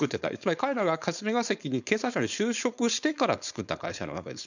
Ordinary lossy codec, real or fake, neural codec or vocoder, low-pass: none; fake; codec, 16 kHz, 4.8 kbps, FACodec; 7.2 kHz